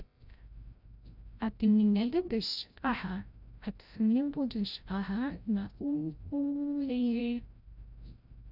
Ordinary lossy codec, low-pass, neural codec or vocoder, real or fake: none; 5.4 kHz; codec, 16 kHz, 0.5 kbps, FreqCodec, larger model; fake